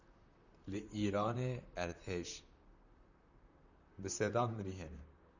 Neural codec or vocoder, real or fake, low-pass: vocoder, 44.1 kHz, 128 mel bands, Pupu-Vocoder; fake; 7.2 kHz